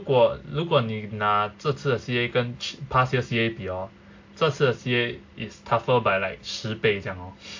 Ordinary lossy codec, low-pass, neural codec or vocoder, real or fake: AAC, 48 kbps; 7.2 kHz; none; real